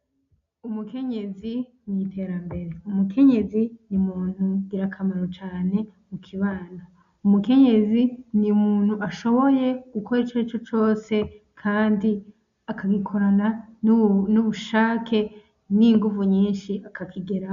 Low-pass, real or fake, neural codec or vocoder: 7.2 kHz; real; none